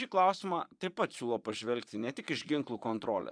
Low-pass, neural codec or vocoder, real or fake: 9.9 kHz; vocoder, 22.05 kHz, 80 mel bands, Vocos; fake